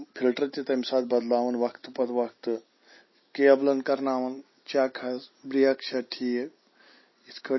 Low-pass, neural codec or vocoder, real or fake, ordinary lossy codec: 7.2 kHz; none; real; MP3, 24 kbps